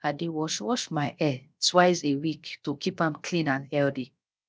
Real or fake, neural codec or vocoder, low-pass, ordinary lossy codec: fake; codec, 16 kHz, 0.7 kbps, FocalCodec; none; none